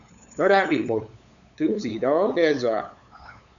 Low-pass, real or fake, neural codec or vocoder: 7.2 kHz; fake; codec, 16 kHz, 8 kbps, FunCodec, trained on LibriTTS, 25 frames a second